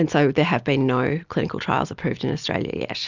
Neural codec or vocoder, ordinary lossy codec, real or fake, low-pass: none; Opus, 64 kbps; real; 7.2 kHz